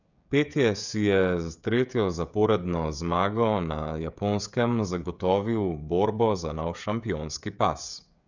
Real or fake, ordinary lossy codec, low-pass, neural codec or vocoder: fake; none; 7.2 kHz; codec, 16 kHz, 16 kbps, FreqCodec, smaller model